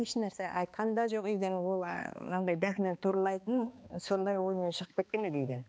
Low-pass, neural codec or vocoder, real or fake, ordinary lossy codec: none; codec, 16 kHz, 2 kbps, X-Codec, HuBERT features, trained on balanced general audio; fake; none